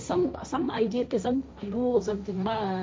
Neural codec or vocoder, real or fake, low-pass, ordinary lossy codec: codec, 16 kHz, 1.1 kbps, Voila-Tokenizer; fake; none; none